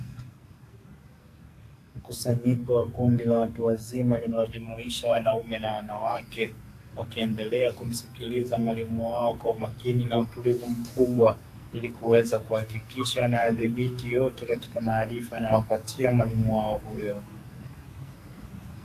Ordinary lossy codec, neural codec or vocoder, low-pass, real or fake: AAC, 64 kbps; codec, 44.1 kHz, 2.6 kbps, SNAC; 14.4 kHz; fake